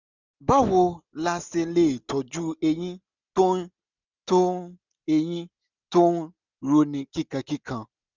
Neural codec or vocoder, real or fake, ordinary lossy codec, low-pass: none; real; none; 7.2 kHz